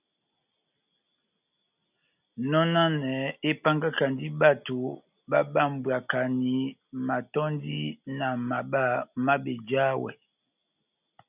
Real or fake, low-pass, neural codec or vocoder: real; 3.6 kHz; none